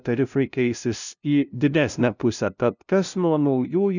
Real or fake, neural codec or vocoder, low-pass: fake; codec, 16 kHz, 0.5 kbps, FunCodec, trained on LibriTTS, 25 frames a second; 7.2 kHz